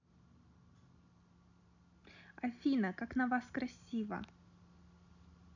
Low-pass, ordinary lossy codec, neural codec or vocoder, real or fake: 7.2 kHz; none; none; real